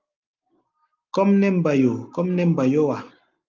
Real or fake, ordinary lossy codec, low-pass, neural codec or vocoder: real; Opus, 24 kbps; 7.2 kHz; none